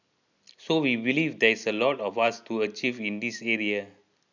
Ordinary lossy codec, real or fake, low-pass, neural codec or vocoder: none; real; 7.2 kHz; none